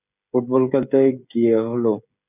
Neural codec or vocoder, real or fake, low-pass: codec, 16 kHz, 16 kbps, FreqCodec, smaller model; fake; 3.6 kHz